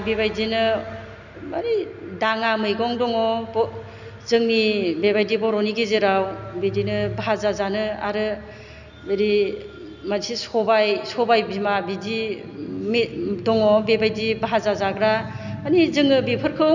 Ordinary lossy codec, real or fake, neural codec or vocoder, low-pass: none; real; none; 7.2 kHz